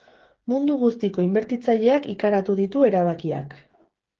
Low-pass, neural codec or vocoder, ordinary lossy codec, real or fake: 7.2 kHz; codec, 16 kHz, 8 kbps, FreqCodec, smaller model; Opus, 16 kbps; fake